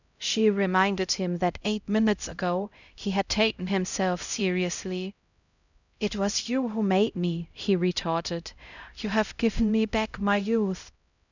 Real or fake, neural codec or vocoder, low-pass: fake; codec, 16 kHz, 0.5 kbps, X-Codec, HuBERT features, trained on LibriSpeech; 7.2 kHz